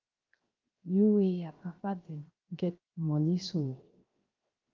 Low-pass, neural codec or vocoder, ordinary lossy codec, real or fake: 7.2 kHz; codec, 16 kHz, 0.7 kbps, FocalCodec; Opus, 32 kbps; fake